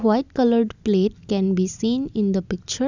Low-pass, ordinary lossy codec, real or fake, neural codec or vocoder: 7.2 kHz; none; real; none